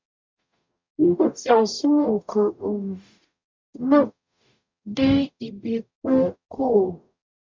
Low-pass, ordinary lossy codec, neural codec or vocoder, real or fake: 7.2 kHz; MP3, 64 kbps; codec, 44.1 kHz, 0.9 kbps, DAC; fake